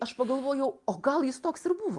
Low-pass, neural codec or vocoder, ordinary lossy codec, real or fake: 10.8 kHz; none; Opus, 32 kbps; real